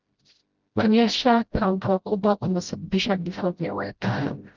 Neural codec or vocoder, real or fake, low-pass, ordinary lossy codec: codec, 16 kHz, 0.5 kbps, FreqCodec, smaller model; fake; 7.2 kHz; Opus, 32 kbps